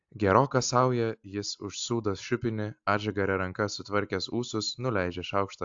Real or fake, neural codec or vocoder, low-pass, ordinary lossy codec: real; none; 7.2 kHz; AAC, 64 kbps